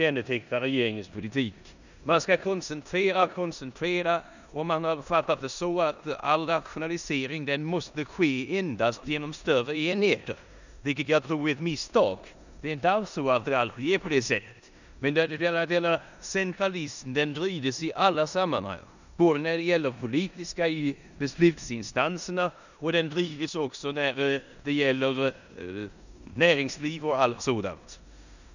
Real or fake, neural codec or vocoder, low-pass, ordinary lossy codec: fake; codec, 16 kHz in and 24 kHz out, 0.9 kbps, LongCat-Audio-Codec, four codebook decoder; 7.2 kHz; none